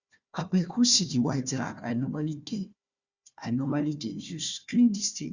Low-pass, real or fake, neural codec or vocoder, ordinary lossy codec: 7.2 kHz; fake; codec, 16 kHz, 1 kbps, FunCodec, trained on Chinese and English, 50 frames a second; Opus, 64 kbps